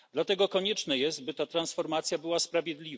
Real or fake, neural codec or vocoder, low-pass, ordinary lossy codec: real; none; none; none